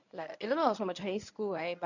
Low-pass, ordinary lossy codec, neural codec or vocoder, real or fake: 7.2 kHz; none; codec, 24 kHz, 0.9 kbps, WavTokenizer, medium speech release version 1; fake